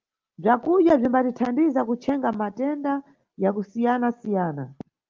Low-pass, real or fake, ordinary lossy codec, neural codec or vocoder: 7.2 kHz; real; Opus, 32 kbps; none